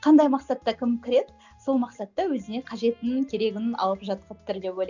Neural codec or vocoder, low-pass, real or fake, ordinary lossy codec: none; 7.2 kHz; real; none